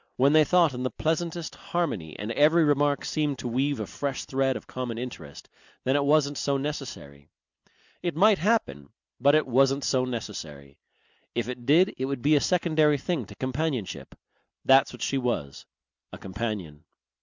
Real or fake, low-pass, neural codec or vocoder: real; 7.2 kHz; none